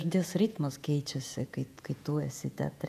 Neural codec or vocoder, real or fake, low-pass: vocoder, 48 kHz, 128 mel bands, Vocos; fake; 14.4 kHz